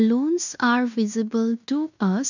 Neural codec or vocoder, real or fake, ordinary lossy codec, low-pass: codec, 16 kHz in and 24 kHz out, 0.9 kbps, LongCat-Audio-Codec, fine tuned four codebook decoder; fake; none; 7.2 kHz